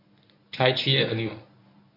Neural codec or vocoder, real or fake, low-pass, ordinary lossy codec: codec, 24 kHz, 0.9 kbps, WavTokenizer, medium speech release version 1; fake; 5.4 kHz; none